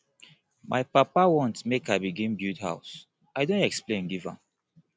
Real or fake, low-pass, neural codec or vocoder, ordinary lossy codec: real; none; none; none